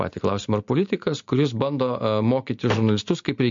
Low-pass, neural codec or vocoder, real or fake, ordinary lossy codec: 7.2 kHz; none; real; MP3, 48 kbps